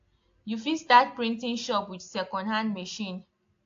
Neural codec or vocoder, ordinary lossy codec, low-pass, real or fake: none; AAC, 48 kbps; 7.2 kHz; real